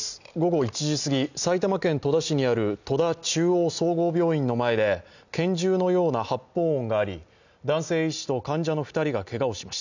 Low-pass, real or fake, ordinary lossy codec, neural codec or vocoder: 7.2 kHz; real; none; none